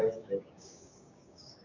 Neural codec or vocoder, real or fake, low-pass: codec, 16 kHz, 6 kbps, DAC; fake; 7.2 kHz